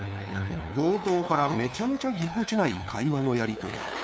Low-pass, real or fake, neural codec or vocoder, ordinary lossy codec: none; fake; codec, 16 kHz, 2 kbps, FunCodec, trained on LibriTTS, 25 frames a second; none